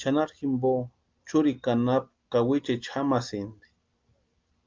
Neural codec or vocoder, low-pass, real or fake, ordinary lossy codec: none; 7.2 kHz; real; Opus, 32 kbps